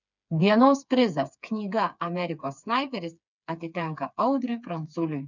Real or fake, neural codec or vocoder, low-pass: fake; codec, 16 kHz, 4 kbps, FreqCodec, smaller model; 7.2 kHz